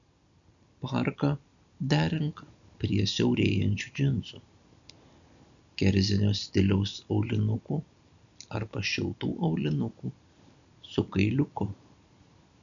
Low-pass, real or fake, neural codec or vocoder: 7.2 kHz; real; none